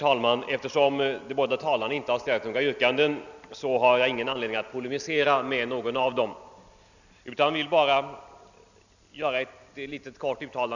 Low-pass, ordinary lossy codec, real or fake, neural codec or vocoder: 7.2 kHz; none; real; none